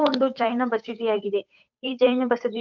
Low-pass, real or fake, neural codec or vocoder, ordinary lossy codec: 7.2 kHz; fake; codec, 16 kHz, 8 kbps, FunCodec, trained on Chinese and English, 25 frames a second; none